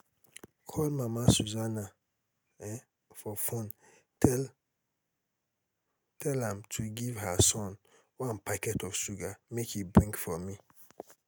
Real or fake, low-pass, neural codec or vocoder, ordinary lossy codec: real; none; none; none